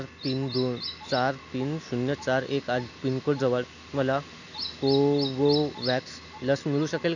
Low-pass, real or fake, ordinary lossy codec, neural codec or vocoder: 7.2 kHz; real; none; none